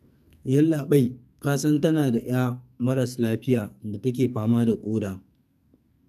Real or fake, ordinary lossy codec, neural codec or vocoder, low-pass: fake; none; codec, 44.1 kHz, 2.6 kbps, SNAC; 14.4 kHz